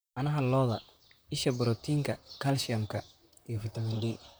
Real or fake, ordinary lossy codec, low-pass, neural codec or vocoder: fake; none; none; vocoder, 44.1 kHz, 128 mel bands, Pupu-Vocoder